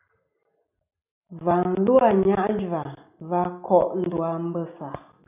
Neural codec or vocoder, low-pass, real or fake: none; 3.6 kHz; real